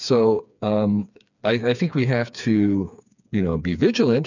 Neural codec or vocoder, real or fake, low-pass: codec, 16 kHz, 4 kbps, FreqCodec, smaller model; fake; 7.2 kHz